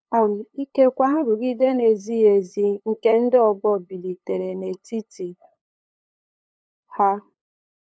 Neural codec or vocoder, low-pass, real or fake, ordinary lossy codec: codec, 16 kHz, 8 kbps, FunCodec, trained on LibriTTS, 25 frames a second; none; fake; none